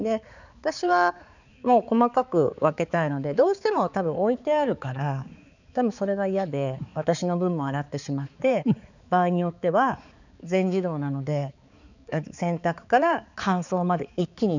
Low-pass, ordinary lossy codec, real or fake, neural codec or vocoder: 7.2 kHz; none; fake; codec, 16 kHz, 4 kbps, X-Codec, HuBERT features, trained on balanced general audio